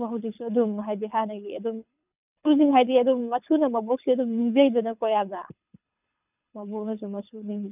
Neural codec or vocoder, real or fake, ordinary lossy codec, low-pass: codec, 24 kHz, 6 kbps, HILCodec; fake; none; 3.6 kHz